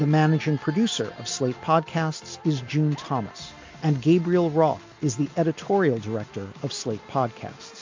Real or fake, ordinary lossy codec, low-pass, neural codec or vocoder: real; MP3, 48 kbps; 7.2 kHz; none